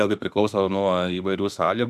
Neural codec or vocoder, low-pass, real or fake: autoencoder, 48 kHz, 32 numbers a frame, DAC-VAE, trained on Japanese speech; 14.4 kHz; fake